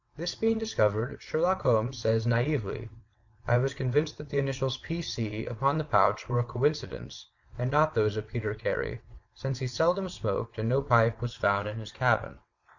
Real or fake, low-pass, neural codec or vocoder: fake; 7.2 kHz; vocoder, 22.05 kHz, 80 mel bands, WaveNeXt